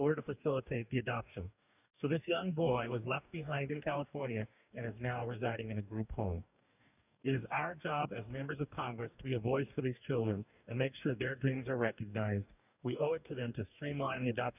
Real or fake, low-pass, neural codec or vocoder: fake; 3.6 kHz; codec, 44.1 kHz, 2.6 kbps, DAC